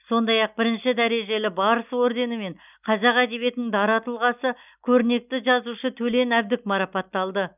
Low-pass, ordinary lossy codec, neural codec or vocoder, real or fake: 3.6 kHz; none; none; real